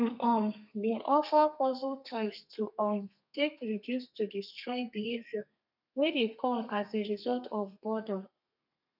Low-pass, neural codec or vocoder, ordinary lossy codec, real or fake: 5.4 kHz; codec, 32 kHz, 1.9 kbps, SNAC; none; fake